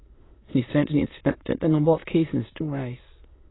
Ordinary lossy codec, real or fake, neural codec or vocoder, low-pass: AAC, 16 kbps; fake; autoencoder, 22.05 kHz, a latent of 192 numbers a frame, VITS, trained on many speakers; 7.2 kHz